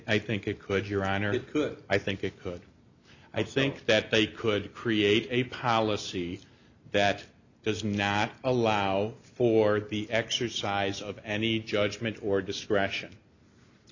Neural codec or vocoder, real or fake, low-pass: none; real; 7.2 kHz